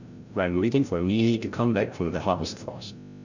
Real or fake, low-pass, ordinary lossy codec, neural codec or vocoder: fake; 7.2 kHz; Opus, 64 kbps; codec, 16 kHz, 0.5 kbps, FreqCodec, larger model